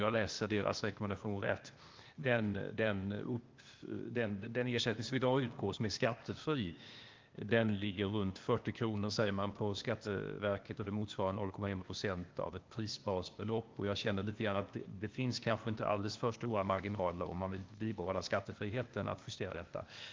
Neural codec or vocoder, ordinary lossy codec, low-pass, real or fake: codec, 16 kHz, 0.8 kbps, ZipCodec; Opus, 32 kbps; 7.2 kHz; fake